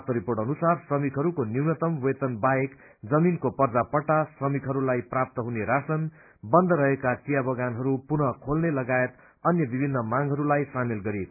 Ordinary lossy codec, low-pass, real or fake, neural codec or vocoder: none; 3.6 kHz; real; none